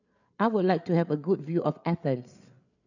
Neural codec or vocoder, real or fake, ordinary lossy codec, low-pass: codec, 16 kHz, 8 kbps, FreqCodec, larger model; fake; none; 7.2 kHz